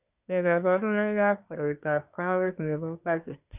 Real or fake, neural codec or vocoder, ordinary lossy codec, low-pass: fake; codec, 24 kHz, 1 kbps, SNAC; none; 3.6 kHz